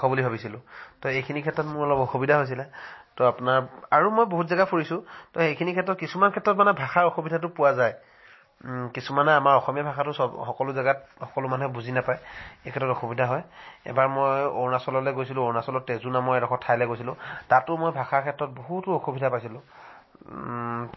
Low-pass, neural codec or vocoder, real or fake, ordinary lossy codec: 7.2 kHz; none; real; MP3, 24 kbps